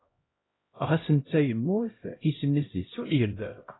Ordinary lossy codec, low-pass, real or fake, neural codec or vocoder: AAC, 16 kbps; 7.2 kHz; fake; codec, 16 kHz, 0.5 kbps, X-Codec, HuBERT features, trained on LibriSpeech